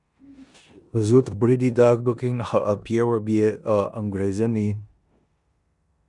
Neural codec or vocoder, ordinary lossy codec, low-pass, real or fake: codec, 16 kHz in and 24 kHz out, 0.9 kbps, LongCat-Audio-Codec, four codebook decoder; Opus, 64 kbps; 10.8 kHz; fake